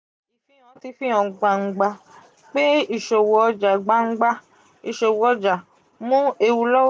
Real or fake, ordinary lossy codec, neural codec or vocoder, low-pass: real; none; none; none